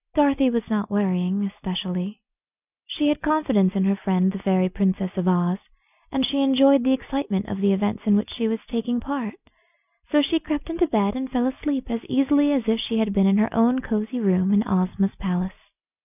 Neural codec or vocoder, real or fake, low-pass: none; real; 3.6 kHz